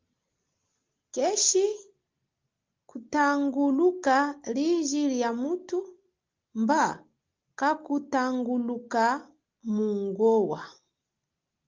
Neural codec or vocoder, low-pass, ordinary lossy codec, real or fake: none; 7.2 kHz; Opus, 32 kbps; real